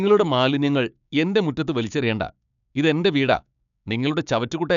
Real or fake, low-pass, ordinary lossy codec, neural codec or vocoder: fake; 7.2 kHz; none; codec, 16 kHz, 6 kbps, DAC